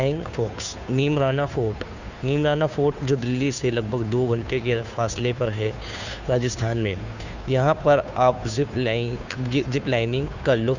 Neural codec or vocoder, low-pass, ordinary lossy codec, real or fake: codec, 16 kHz, 2 kbps, FunCodec, trained on Chinese and English, 25 frames a second; 7.2 kHz; none; fake